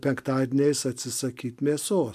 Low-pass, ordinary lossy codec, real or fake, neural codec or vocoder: 14.4 kHz; MP3, 96 kbps; real; none